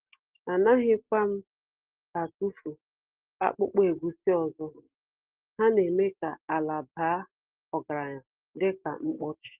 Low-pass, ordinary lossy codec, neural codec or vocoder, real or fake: 3.6 kHz; Opus, 16 kbps; none; real